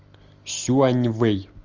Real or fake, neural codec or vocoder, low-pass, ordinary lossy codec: real; none; 7.2 kHz; Opus, 32 kbps